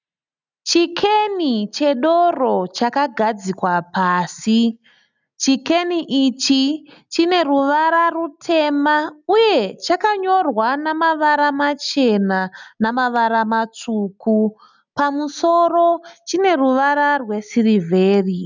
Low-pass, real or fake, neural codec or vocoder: 7.2 kHz; real; none